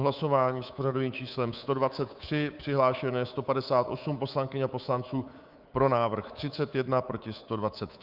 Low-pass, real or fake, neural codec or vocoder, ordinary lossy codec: 5.4 kHz; fake; codec, 24 kHz, 3.1 kbps, DualCodec; Opus, 24 kbps